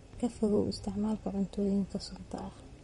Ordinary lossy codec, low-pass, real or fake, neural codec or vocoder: MP3, 48 kbps; 19.8 kHz; fake; vocoder, 44.1 kHz, 128 mel bands, Pupu-Vocoder